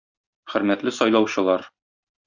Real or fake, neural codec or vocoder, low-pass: real; none; 7.2 kHz